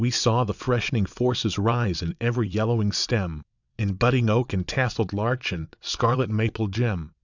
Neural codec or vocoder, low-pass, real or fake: vocoder, 22.05 kHz, 80 mel bands, WaveNeXt; 7.2 kHz; fake